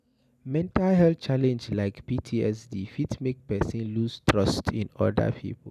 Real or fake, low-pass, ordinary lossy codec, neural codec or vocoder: real; 14.4 kHz; none; none